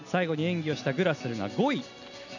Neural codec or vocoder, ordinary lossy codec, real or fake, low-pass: none; none; real; 7.2 kHz